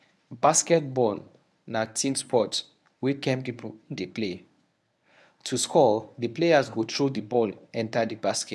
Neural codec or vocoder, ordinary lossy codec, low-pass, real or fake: codec, 24 kHz, 0.9 kbps, WavTokenizer, medium speech release version 1; none; none; fake